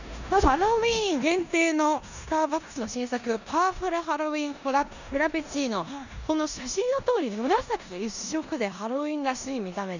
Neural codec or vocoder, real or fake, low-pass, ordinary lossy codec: codec, 16 kHz in and 24 kHz out, 0.9 kbps, LongCat-Audio-Codec, four codebook decoder; fake; 7.2 kHz; none